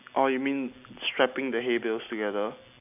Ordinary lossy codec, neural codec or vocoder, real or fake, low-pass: none; none; real; 3.6 kHz